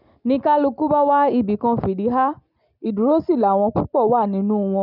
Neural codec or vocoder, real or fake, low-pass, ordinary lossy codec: none; real; 5.4 kHz; none